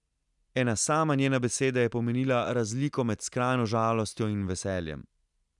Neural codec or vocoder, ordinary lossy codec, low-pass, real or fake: none; none; 10.8 kHz; real